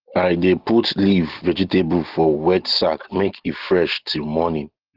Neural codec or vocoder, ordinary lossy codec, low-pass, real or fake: none; Opus, 16 kbps; 5.4 kHz; real